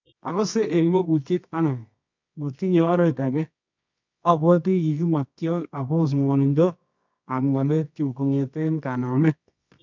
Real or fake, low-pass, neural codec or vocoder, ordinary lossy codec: fake; 7.2 kHz; codec, 24 kHz, 0.9 kbps, WavTokenizer, medium music audio release; MP3, 64 kbps